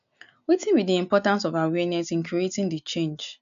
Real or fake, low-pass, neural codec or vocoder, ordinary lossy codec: real; 7.2 kHz; none; none